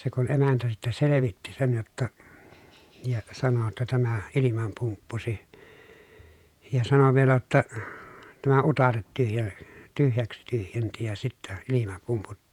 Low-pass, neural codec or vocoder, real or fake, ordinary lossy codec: 19.8 kHz; vocoder, 48 kHz, 128 mel bands, Vocos; fake; none